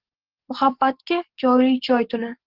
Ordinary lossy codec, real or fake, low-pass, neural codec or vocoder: Opus, 16 kbps; real; 5.4 kHz; none